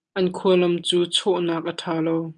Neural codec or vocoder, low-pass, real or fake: none; 9.9 kHz; real